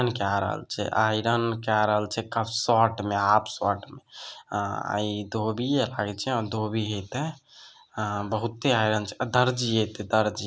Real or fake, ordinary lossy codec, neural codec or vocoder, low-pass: real; none; none; none